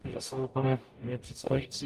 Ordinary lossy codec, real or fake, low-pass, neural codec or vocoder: Opus, 32 kbps; fake; 14.4 kHz; codec, 44.1 kHz, 0.9 kbps, DAC